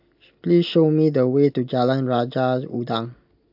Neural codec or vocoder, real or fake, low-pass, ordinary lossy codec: none; real; 5.4 kHz; AAC, 48 kbps